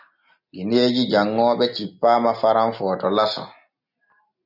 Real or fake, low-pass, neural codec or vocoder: real; 5.4 kHz; none